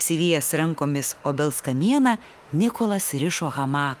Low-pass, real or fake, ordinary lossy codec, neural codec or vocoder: 14.4 kHz; fake; Opus, 32 kbps; autoencoder, 48 kHz, 32 numbers a frame, DAC-VAE, trained on Japanese speech